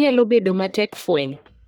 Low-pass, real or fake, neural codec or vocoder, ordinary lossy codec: none; fake; codec, 44.1 kHz, 1.7 kbps, Pupu-Codec; none